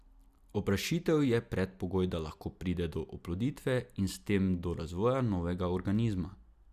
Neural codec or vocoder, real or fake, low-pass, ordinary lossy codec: none; real; 14.4 kHz; Opus, 64 kbps